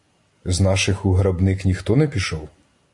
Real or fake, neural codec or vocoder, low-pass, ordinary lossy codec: real; none; 10.8 kHz; AAC, 64 kbps